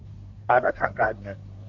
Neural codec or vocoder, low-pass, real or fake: codec, 24 kHz, 1 kbps, SNAC; 7.2 kHz; fake